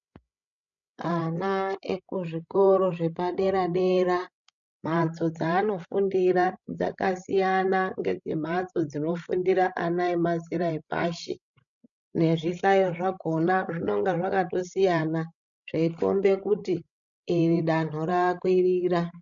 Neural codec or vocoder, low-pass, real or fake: codec, 16 kHz, 16 kbps, FreqCodec, larger model; 7.2 kHz; fake